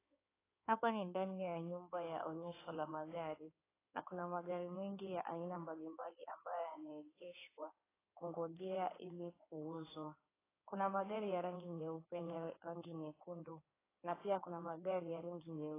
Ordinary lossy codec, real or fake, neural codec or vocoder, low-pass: AAC, 16 kbps; fake; codec, 16 kHz in and 24 kHz out, 2.2 kbps, FireRedTTS-2 codec; 3.6 kHz